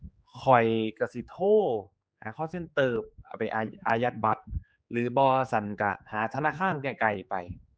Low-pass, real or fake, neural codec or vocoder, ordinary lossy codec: none; fake; codec, 16 kHz, 4 kbps, X-Codec, HuBERT features, trained on general audio; none